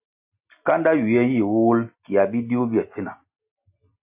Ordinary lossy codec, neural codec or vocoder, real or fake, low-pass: AAC, 32 kbps; none; real; 3.6 kHz